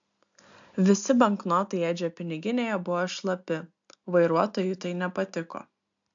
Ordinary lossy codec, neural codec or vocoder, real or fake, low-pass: AAC, 64 kbps; none; real; 7.2 kHz